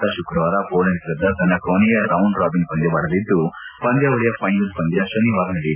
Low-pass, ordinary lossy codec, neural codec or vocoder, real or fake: 3.6 kHz; none; none; real